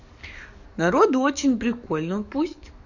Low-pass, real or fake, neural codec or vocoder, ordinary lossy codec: 7.2 kHz; fake; codec, 44.1 kHz, 7.8 kbps, DAC; none